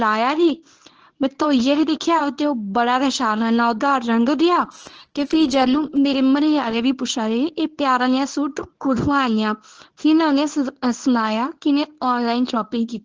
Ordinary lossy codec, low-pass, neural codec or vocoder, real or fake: Opus, 16 kbps; 7.2 kHz; codec, 24 kHz, 0.9 kbps, WavTokenizer, medium speech release version 1; fake